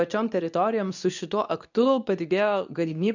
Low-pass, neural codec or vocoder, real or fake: 7.2 kHz; codec, 24 kHz, 0.9 kbps, WavTokenizer, medium speech release version 2; fake